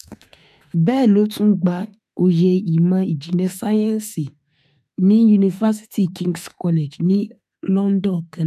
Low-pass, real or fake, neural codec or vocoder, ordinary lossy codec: 14.4 kHz; fake; autoencoder, 48 kHz, 32 numbers a frame, DAC-VAE, trained on Japanese speech; none